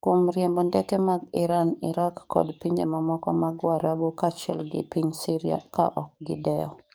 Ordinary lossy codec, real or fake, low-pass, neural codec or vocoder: none; fake; none; codec, 44.1 kHz, 7.8 kbps, DAC